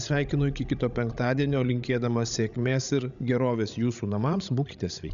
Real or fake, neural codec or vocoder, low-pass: fake; codec, 16 kHz, 16 kbps, FreqCodec, larger model; 7.2 kHz